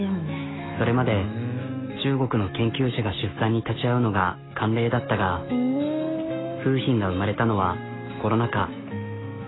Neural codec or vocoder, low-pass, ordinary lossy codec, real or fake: autoencoder, 48 kHz, 128 numbers a frame, DAC-VAE, trained on Japanese speech; 7.2 kHz; AAC, 16 kbps; fake